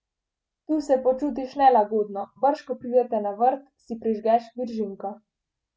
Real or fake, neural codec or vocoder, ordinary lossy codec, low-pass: real; none; none; none